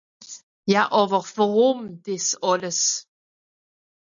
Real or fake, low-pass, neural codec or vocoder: real; 7.2 kHz; none